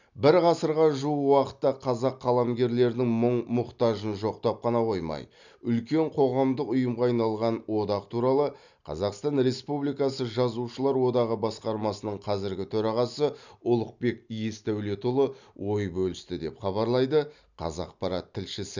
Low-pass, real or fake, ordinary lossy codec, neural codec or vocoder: 7.2 kHz; real; none; none